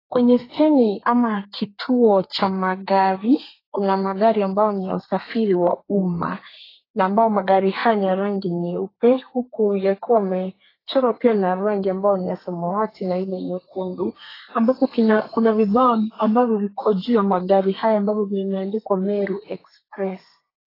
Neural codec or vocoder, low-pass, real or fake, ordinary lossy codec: codec, 32 kHz, 1.9 kbps, SNAC; 5.4 kHz; fake; AAC, 24 kbps